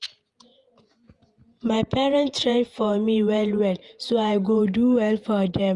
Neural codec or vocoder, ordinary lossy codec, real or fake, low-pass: vocoder, 48 kHz, 128 mel bands, Vocos; Opus, 32 kbps; fake; 10.8 kHz